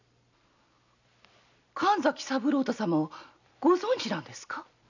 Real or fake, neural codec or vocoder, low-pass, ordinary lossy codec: real; none; 7.2 kHz; none